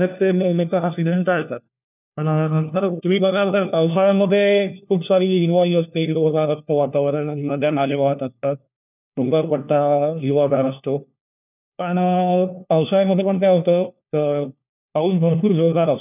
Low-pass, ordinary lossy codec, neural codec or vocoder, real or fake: 3.6 kHz; none; codec, 16 kHz, 1 kbps, FunCodec, trained on LibriTTS, 50 frames a second; fake